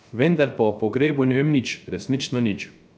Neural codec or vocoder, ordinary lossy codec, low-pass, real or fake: codec, 16 kHz, 0.3 kbps, FocalCodec; none; none; fake